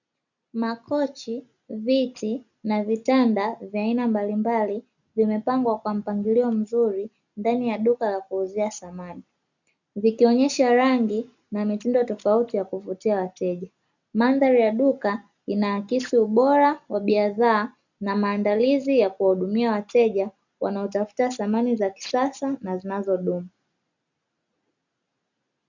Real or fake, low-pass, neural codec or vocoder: real; 7.2 kHz; none